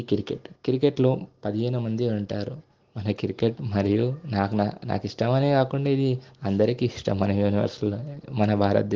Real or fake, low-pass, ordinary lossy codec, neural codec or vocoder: real; 7.2 kHz; Opus, 16 kbps; none